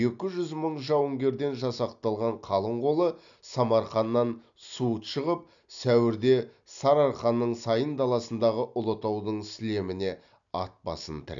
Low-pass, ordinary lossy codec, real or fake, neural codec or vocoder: 7.2 kHz; none; real; none